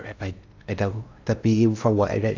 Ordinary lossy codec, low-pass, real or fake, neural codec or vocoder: AAC, 48 kbps; 7.2 kHz; fake; codec, 16 kHz in and 24 kHz out, 0.6 kbps, FocalCodec, streaming, 2048 codes